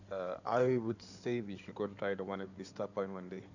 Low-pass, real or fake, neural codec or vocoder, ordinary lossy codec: 7.2 kHz; fake; codec, 16 kHz in and 24 kHz out, 2.2 kbps, FireRedTTS-2 codec; none